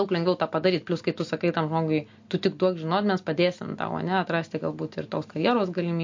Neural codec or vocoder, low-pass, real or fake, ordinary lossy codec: none; 7.2 kHz; real; MP3, 48 kbps